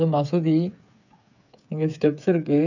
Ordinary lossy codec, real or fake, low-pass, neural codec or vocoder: none; fake; 7.2 kHz; codec, 16 kHz, 8 kbps, FreqCodec, smaller model